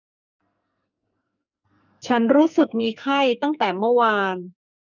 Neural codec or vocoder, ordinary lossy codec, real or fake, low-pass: codec, 44.1 kHz, 2.6 kbps, SNAC; none; fake; 7.2 kHz